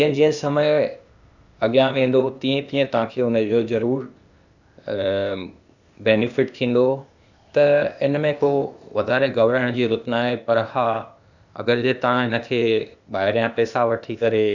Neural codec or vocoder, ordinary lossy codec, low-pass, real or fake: codec, 16 kHz, 0.8 kbps, ZipCodec; none; 7.2 kHz; fake